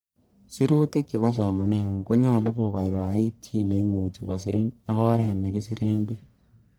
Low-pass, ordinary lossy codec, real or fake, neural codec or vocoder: none; none; fake; codec, 44.1 kHz, 1.7 kbps, Pupu-Codec